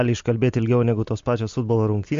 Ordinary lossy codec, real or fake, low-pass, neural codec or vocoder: MP3, 48 kbps; real; 7.2 kHz; none